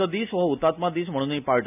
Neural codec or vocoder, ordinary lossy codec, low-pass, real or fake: none; none; 3.6 kHz; real